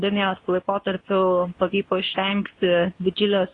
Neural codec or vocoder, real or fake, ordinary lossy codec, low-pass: codec, 24 kHz, 0.9 kbps, WavTokenizer, medium speech release version 2; fake; AAC, 32 kbps; 10.8 kHz